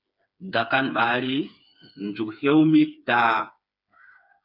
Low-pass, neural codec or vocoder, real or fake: 5.4 kHz; codec, 16 kHz, 4 kbps, FreqCodec, smaller model; fake